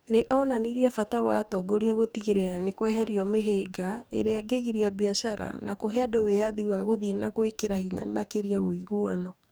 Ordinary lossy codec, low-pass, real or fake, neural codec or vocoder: none; none; fake; codec, 44.1 kHz, 2.6 kbps, DAC